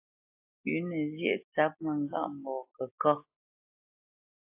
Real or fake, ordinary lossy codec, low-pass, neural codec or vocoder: real; AAC, 32 kbps; 3.6 kHz; none